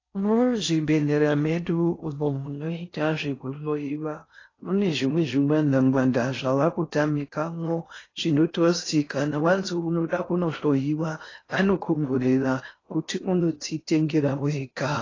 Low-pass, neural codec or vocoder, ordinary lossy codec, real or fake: 7.2 kHz; codec, 16 kHz in and 24 kHz out, 0.6 kbps, FocalCodec, streaming, 4096 codes; AAC, 32 kbps; fake